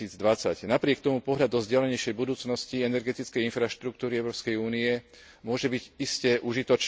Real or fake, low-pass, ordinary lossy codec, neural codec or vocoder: real; none; none; none